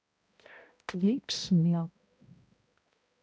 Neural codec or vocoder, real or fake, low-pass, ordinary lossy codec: codec, 16 kHz, 0.5 kbps, X-Codec, HuBERT features, trained on balanced general audio; fake; none; none